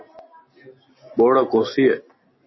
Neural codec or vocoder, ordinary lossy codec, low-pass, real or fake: none; MP3, 24 kbps; 7.2 kHz; real